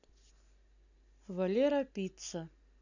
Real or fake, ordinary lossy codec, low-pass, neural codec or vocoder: real; none; 7.2 kHz; none